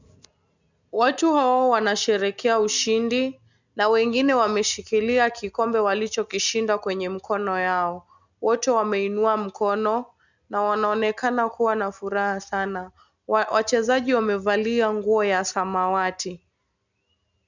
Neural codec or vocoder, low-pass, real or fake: none; 7.2 kHz; real